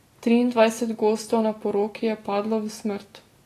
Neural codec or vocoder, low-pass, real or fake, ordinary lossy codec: none; 14.4 kHz; real; AAC, 48 kbps